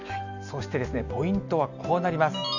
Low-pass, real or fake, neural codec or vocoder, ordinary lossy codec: 7.2 kHz; real; none; none